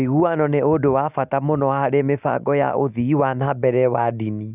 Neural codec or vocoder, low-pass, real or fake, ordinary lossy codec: none; 3.6 kHz; real; none